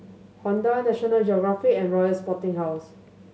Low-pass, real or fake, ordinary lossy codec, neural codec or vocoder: none; real; none; none